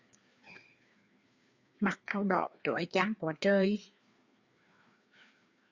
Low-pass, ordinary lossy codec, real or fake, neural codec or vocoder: 7.2 kHz; Opus, 64 kbps; fake; codec, 16 kHz, 2 kbps, FreqCodec, larger model